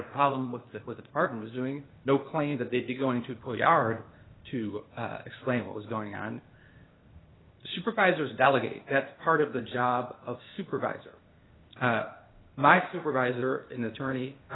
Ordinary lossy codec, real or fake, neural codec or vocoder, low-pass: AAC, 16 kbps; fake; codec, 16 kHz, 0.8 kbps, ZipCodec; 7.2 kHz